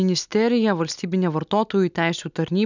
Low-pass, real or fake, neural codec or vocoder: 7.2 kHz; real; none